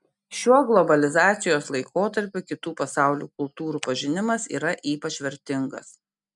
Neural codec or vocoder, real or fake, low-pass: none; real; 10.8 kHz